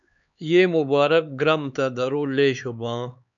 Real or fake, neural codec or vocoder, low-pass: fake; codec, 16 kHz, 4 kbps, X-Codec, HuBERT features, trained on LibriSpeech; 7.2 kHz